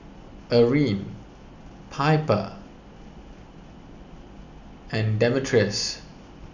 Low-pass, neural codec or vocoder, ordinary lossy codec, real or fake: 7.2 kHz; none; none; real